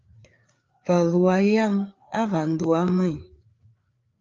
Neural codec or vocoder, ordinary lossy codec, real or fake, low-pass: codec, 16 kHz, 4 kbps, FreqCodec, larger model; Opus, 24 kbps; fake; 7.2 kHz